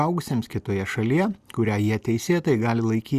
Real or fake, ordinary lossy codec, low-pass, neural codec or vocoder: real; Opus, 64 kbps; 14.4 kHz; none